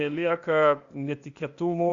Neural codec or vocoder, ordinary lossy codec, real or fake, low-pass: codec, 16 kHz, 0.8 kbps, ZipCodec; Opus, 64 kbps; fake; 7.2 kHz